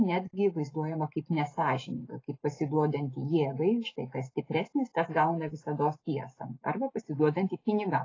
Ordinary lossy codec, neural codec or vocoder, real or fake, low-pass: AAC, 32 kbps; none; real; 7.2 kHz